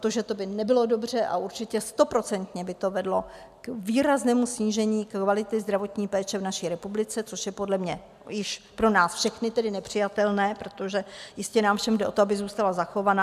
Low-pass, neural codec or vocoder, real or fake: 14.4 kHz; none; real